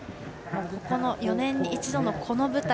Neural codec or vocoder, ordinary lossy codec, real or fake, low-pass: none; none; real; none